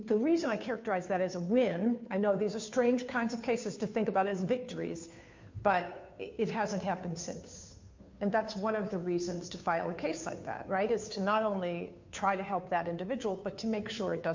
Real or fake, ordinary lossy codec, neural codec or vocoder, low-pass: fake; MP3, 48 kbps; codec, 16 kHz, 2 kbps, FunCodec, trained on Chinese and English, 25 frames a second; 7.2 kHz